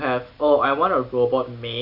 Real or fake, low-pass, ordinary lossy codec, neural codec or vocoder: real; 5.4 kHz; none; none